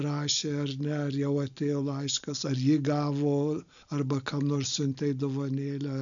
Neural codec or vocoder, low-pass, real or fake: none; 7.2 kHz; real